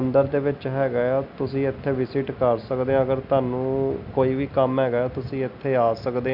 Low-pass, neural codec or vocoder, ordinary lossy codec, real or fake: 5.4 kHz; none; none; real